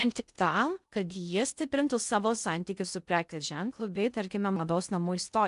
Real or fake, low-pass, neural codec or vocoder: fake; 10.8 kHz; codec, 16 kHz in and 24 kHz out, 0.6 kbps, FocalCodec, streaming, 2048 codes